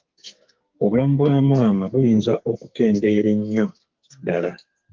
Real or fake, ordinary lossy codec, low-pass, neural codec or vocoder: fake; Opus, 32 kbps; 7.2 kHz; codec, 44.1 kHz, 2.6 kbps, SNAC